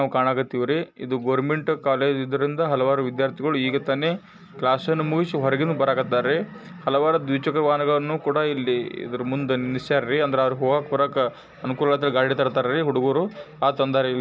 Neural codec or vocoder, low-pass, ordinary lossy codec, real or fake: none; none; none; real